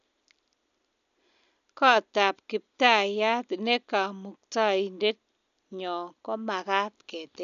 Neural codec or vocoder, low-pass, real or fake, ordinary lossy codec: none; 7.2 kHz; real; none